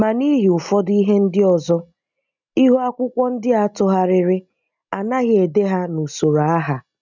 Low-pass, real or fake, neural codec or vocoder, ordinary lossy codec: 7.2 kHz; real; none; none